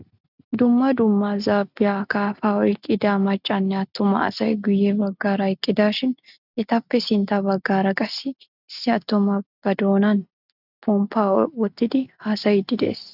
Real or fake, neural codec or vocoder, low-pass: real; none; 5.4 kHz